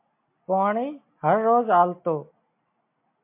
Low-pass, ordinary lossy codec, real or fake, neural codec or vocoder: 3.6 kHz; MP3, 32 kbps; real; none